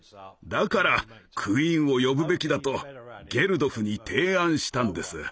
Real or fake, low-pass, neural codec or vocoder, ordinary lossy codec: real; none; none; none